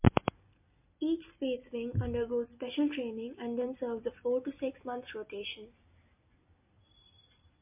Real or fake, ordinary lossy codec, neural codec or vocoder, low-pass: real; MP3, 32 kbps; none; 3.6 kHz